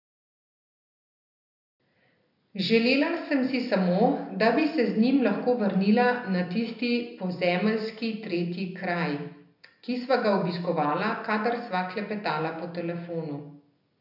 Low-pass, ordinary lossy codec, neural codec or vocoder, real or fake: 5.4 kHz; none; none; real